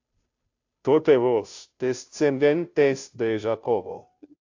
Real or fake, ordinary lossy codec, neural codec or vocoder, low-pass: fake; AAC, 48 kbps; codec, 16 kHz, 0.5 kbps, FunCodec, trained on Chinese and English, 25 frames a second; 7.2 kHz